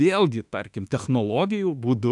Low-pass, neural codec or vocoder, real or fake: 10.8 kHz; autoencoder, 48 kHz, 32 numbers a frame, DAC-VAE, trained on Japanese speech; fake